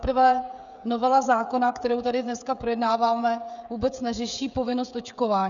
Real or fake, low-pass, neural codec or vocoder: fake; 7.2 kHz; codec, 16 kHz, 16 kbps, FreqCodec, smaller model